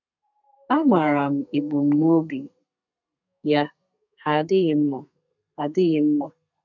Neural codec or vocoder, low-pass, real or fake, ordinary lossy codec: codec, 32 kHz, 1.9 kbps, SNAC; 7.2 kHz; fake; none